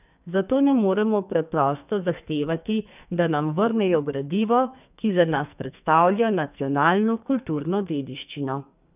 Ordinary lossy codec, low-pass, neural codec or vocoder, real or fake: none; 3.6 kHz; codec, 44.1 kHz, 2.6 kbps, SNAC; fake